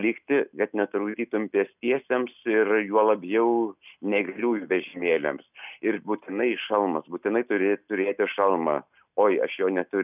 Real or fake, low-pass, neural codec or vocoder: real; 3.6 kHz; none